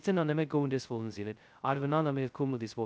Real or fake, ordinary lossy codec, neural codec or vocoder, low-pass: fake; none; codec, 16 kHz, 0.2 kbps, FocalCodec; none